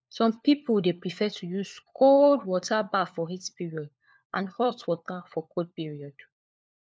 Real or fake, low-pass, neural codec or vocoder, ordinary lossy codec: fake; none; codec, 16 kHz, 4 kbps, FunCodec, trained on LibriTTS, 50 frames a second; none